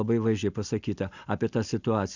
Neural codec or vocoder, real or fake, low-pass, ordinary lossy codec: none; real; 7.2 kHz; Opus, 64 kbps